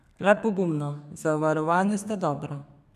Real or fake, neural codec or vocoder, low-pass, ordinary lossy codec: fake; codec, 32 kHz, 1.9 kbps, SNAC; 14.4 kHz; none